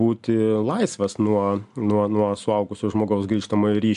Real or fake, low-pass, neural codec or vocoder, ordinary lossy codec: real; 10.8 kHz; none; AAC, 96 kbps